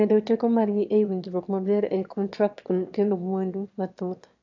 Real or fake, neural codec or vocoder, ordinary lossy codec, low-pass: fake; autoencoder, 22.05 kHz, a latent of 192 numbers a frame, VITS, trained on one speaker; AAC, 48 kbps; 7.2 kHz